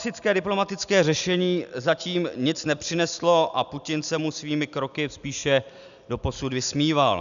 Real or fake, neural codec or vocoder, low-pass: real; none; 7.2 kHz